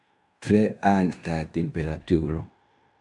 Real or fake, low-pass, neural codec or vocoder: fake; 10.8 kHz; codec, 16 kHz in and 24 kHz out, 0.9 kbps, LongCat-Audio-Codec, fine tuned four codebook decoder